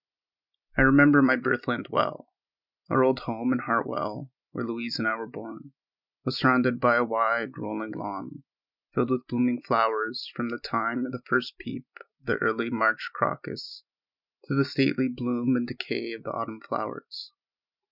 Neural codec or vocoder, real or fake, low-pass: none; real; 5.4 kHz